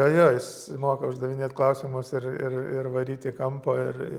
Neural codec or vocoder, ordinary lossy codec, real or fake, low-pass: none; Opus, 32 kbps; real; 19.8 kHz